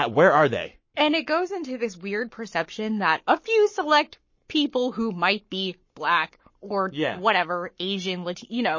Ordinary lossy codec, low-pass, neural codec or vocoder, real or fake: MP3, 32 kbps; 7.2 kHz; codec, 44.1 kHz, 7.8 kbps, Pupu-Codec; fake